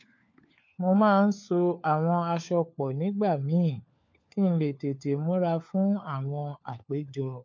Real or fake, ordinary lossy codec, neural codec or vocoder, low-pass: fake; MP3, 48 kbps; codec, 16 kHz, 4 kbps, FunCodec, trained on LibriTTS, 50 frames a second; 7.2 kHz